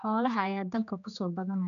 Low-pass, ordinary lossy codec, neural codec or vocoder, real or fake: 7.2 kHz; none; codec, 16 kHz, 2 kbps, X-Codec, HuBERT features, trained on general audio; fake